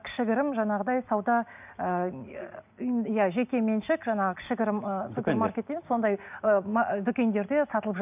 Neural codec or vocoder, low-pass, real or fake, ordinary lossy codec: none; 3.6 kHz; real; none